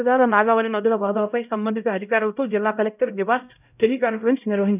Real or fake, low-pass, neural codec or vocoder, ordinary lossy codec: fake; 3.6 kHz; codec, 16 kHz, 0.5 kbps, X-Codec, HuBERT features, trained on LibriSpeech; none